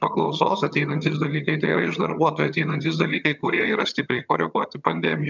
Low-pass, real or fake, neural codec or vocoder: 7.2 kHz; fake; vocoder, 22.05 kHz, 80 mel bands, HiFi-GAN